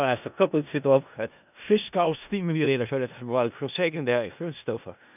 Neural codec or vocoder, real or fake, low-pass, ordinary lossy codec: codec, 16 kHz in and 24 kHz out, 0.4 kbps, LongCat-Audio-Codec, four codebook decoder; fake; 3.6 kHz; none